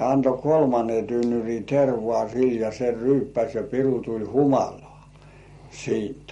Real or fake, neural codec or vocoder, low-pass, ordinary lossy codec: real; none; 19.8 kHz; MP3, 48 kbps